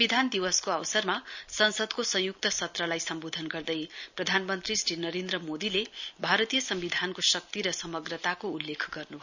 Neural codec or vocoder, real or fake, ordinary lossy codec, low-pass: none; real; none; 7.2 kHz